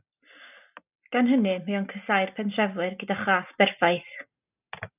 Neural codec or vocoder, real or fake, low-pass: none; real; 3.6 kHz